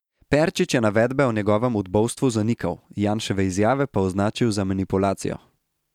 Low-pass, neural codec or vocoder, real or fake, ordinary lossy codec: 19.8 kHz; none; real; none